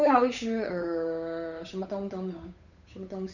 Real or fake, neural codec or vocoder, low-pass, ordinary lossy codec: fake; codec, 16 kHz, 8 kbps, FunCodec, trained on Chinese and English, 25 frames a second; 7.2 kHz; none